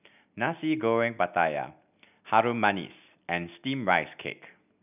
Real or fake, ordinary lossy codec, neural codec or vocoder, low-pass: real; none; none; 3.6 kHz